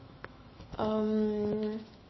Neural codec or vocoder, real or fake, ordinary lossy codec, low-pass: autoencoder, 48 kHz, 128 numbers a frame, DAC-VAE, trained on Japanese speech; fake; MP3, 24 kbps; 7.2 kHz